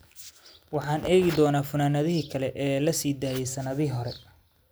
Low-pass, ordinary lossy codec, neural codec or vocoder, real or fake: none; none; none; real